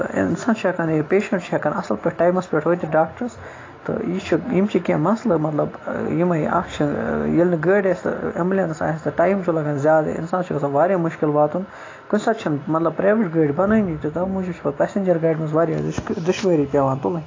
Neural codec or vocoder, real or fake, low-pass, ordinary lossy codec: none; real; 7.2 kHz; AAC, 32 kbps